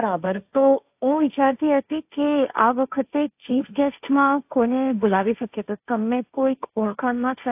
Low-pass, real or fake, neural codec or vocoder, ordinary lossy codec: 3.6 kHz; fake; codec, 16 kHz, 1.1 kbps, Voila-Tokenizer; AAC, 32 kbps